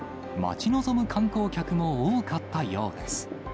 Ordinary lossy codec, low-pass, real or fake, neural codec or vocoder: none; none; real; none